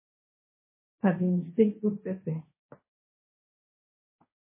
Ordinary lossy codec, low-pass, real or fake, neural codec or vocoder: MP3, 16 kbps; 3.6 kHz; fake; codec, 16 kHz, 1.1 kbps, Voila-Tokenizer